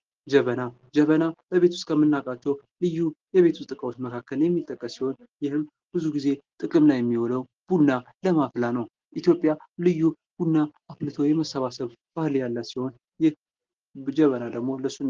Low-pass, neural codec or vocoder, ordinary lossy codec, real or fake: 7.2 kHz; none; Opus, 16 kbps; real